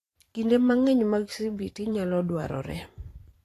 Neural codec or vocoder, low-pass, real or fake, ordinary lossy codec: vocoder, 44.1 kHz, 128 mel bands every 512 samples, BigVGAN v2; 14.4 kHz; fake; AAC, 48 kbps